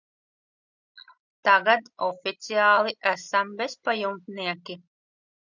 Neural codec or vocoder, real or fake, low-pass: none; real; 7.2 kHz